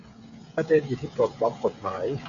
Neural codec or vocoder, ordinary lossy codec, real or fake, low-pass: codec, 16 kHz, 16 kbps, FreqCodec, smaller model; Opus, 64 kbps; fake; 7.2 kHz